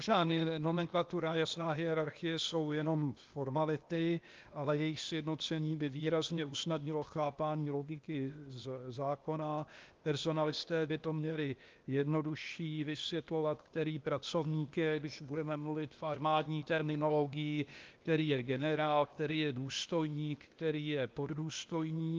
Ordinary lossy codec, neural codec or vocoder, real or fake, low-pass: Opus, 24 kbps; codec, 16 kHz, 0.8 kbps, ZipCodec; fake; 7.2 kHz